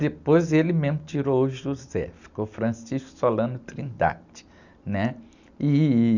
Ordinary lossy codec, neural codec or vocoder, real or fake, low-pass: none; none; real; 7.2 kHz